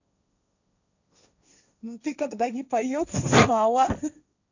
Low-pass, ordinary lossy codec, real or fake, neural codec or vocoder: 7.2 kHz; none; fake; codec, 16 kHz, 1.1 kbps, Voila-Tokenizer